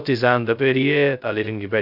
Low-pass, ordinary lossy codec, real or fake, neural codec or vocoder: 5.4 kHz; none; fake; codec, 16 kHz, 0.2 kbps, FocalCodec